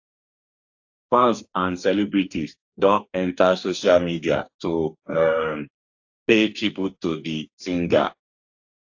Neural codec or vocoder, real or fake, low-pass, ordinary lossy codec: codec, 44.1 kHz, 2.6 kbps, DAC; fake; 7.2 kHz; AAC, 48 kbps